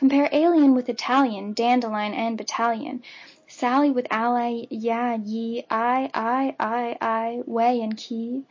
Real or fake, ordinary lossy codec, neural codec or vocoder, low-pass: real; MP3, 32 kbps; none; 7.2 kHz